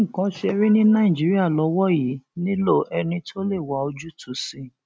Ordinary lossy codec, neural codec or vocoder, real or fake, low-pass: none; none; real; none